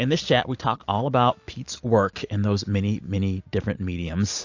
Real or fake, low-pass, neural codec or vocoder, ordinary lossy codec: real; 7.2 kHz; none; AAC, 48 kbps